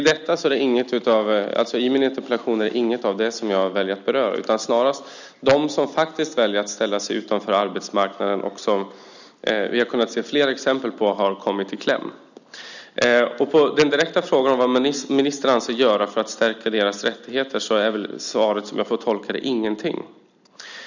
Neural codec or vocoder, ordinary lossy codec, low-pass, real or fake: none; none; 7.2 kHz; real